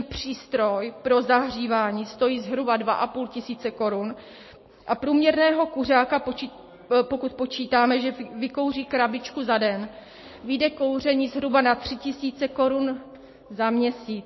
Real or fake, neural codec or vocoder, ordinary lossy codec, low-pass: real; none; MP3, 24 kbps; 7.2 kHz